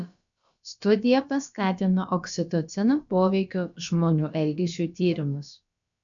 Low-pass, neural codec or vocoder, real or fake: 7.2 kHz; codec, 16 kHz, about 1 kbps, DyCAST, with the encoder's durations; fake